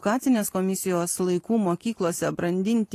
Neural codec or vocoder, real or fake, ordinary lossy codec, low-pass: vocoder, 44.1 kHz, 128 mel bands every 512 samples, BigVGAN v2; fake; AAC, 48 kbps; 14.4 kHz